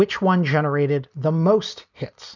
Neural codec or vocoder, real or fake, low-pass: none; real; 7.2 kHz